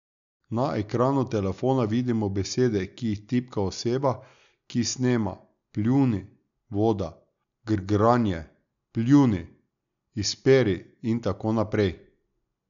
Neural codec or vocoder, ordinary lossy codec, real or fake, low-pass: none; none; real; 7.2 kHz